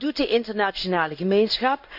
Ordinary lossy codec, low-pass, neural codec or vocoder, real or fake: none; 5.4 kHz; codec, 16 kHz in and 24 kHz out, 1 kbps, XY-Tokenizer; fake